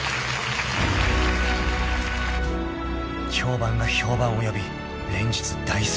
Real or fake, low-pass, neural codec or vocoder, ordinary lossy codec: real; none; none; none